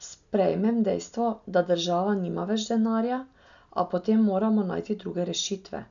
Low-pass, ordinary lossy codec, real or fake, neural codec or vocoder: 7.2 kHz; none; real; none